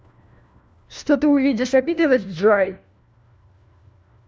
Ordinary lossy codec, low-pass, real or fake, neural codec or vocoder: none; none; fake; codec, 16 kHz, 1 kbps, FunCodec, trained on LibriTTS, 50 frames a second